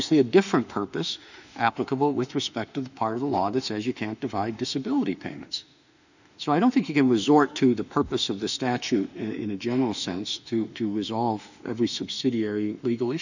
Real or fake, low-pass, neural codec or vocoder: fake; 7.2 kHz; autoencoder, 48 kHz, 32 numbers a frame, DAC-VAE, trained on Japanese speech